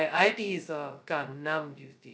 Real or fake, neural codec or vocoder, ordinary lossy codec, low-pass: fake; codec, 16 kHz, 0.2 kbps, FocalCodec; none; none